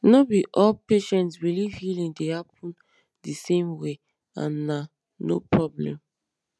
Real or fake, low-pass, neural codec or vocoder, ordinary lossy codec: real; none; none; none